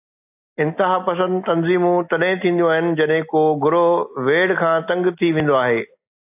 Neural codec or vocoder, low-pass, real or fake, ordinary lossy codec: none; 3.6 kHz; real; AAC, 32 kbps